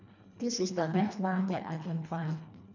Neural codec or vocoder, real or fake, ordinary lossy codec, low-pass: codec, 24 kHz, 1.5 kbps, HILCodec; fake; none; 7.2 kHz